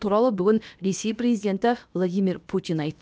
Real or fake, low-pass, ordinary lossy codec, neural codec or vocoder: fake; none; none; codec, 16 kHz, about 1 kbps, DyCAST, with the encoder's durations